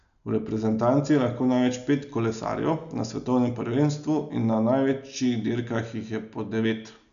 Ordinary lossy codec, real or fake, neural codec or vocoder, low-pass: none; real; none; 7.2 kHz